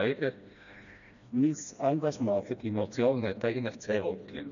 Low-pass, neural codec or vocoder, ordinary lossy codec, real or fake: 7.2 kHz; codec, 16 kHz, 1 kbps, FreqCodec, smaller model; none; fake